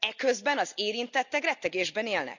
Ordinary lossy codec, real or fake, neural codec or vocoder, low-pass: none; real; none; 7.2 kHz